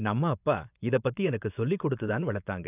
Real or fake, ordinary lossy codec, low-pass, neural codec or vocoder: fake; none; 3.6 kHz; vocoder, 24 kHz, 100 mel bands, Vocos